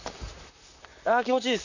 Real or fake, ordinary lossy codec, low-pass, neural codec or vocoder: fake; none; 7.2 kHz; vocoder, 44.1 kHz, 128 mel bands, Pupu-Vocoder